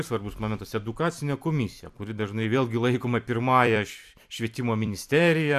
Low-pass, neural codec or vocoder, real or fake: 14.4 kHz; vocoder, 44.1 kHz, 128 mel bands every 256 samples, BigVGAN v2; fake